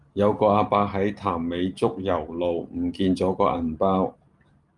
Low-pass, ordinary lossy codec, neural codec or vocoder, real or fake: 10.8 kHz; Opus, 32 kbps; none; real